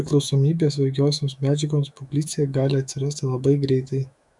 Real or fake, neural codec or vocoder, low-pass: fake; autoencoder, 48 kHz, 128 numbers a frame, DAC-VAE, trained on Japanese speech; 10.8 kHz